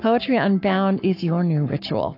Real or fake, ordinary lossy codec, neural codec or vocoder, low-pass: fake; AAC, 32 kbps; codec, 44.1 kHz, 7.8 kbps, Pupu-Codec; 5.4 kHz